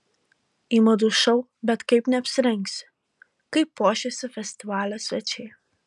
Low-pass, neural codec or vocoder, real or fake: 10.8 kHz; none; real